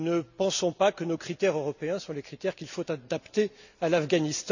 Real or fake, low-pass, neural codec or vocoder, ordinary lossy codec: real; 7.2 kHz; none; none